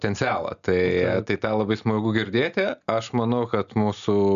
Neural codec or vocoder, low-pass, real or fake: none; 7.2 kHz; real